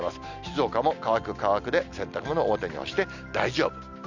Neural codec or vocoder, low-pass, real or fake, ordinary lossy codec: none; 7.2 kHz; real; none